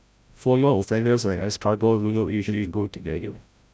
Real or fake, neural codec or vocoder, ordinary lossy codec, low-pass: fake; codec, 16 kHz, 0.5 kbps, FreqCodec, larger model; none; none